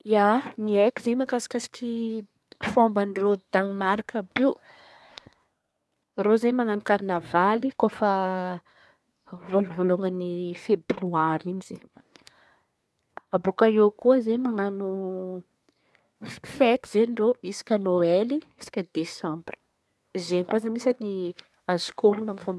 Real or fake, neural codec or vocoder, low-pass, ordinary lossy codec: fake; codec, 24 kHz, 1 kbps, SNAC; none; none